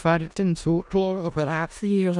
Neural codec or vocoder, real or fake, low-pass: codec, 16 kHz in and 24 kHz out, 0.4 kbps, LongCat-Audio-Codec, four codebook decoder; fake; 10.8 kHz